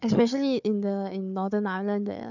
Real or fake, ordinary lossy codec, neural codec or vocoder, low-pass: fake; none; codec, 16 kHz, 4 kbps, FunCodec, trained on Chinese and English, 50 frames a second; 7.2 kHz